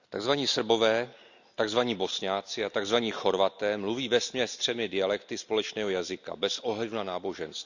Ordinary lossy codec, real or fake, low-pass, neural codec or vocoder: none; real; 7.2 kHz; none